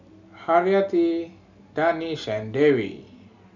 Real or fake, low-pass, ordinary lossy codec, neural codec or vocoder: real; 7.2 kHz; none; none